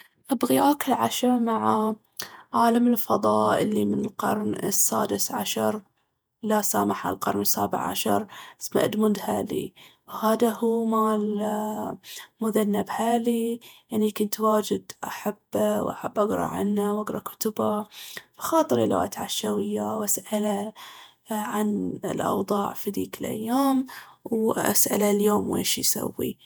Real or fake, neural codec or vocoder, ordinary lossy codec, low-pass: fake; vocoder, 48 kHz, 128 mel bands, Vocos; none; none